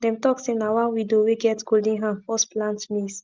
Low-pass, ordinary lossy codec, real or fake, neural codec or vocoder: 7.2 kHz; Opus, 24 kbps; real; none